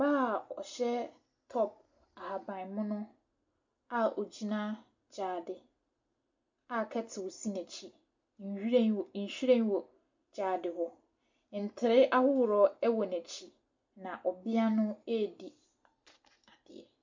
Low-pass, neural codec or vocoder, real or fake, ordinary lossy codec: 7.2 kHz; none; real; MP3, 48 kbps